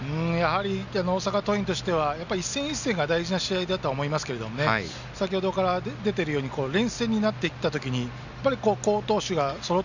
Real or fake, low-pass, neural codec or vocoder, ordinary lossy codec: real; 7.2 kHz; none; none